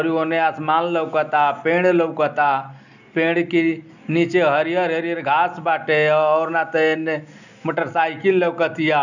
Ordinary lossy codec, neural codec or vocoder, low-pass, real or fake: none; none; 7.2 kHz; real